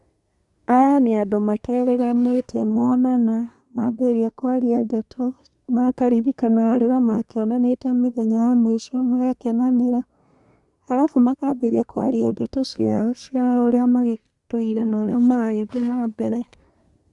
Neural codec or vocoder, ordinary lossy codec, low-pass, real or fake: codec, 24 kHz, 1 kbps, SNAC; Opus, 64 kbps; 10.8 kHz; fake